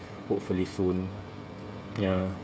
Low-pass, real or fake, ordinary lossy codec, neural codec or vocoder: none; fake; none; codec, 16 kHz, 16 kbps, FreqCodec, smaller model